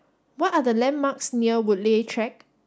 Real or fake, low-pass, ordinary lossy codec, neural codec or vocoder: real; none; none; none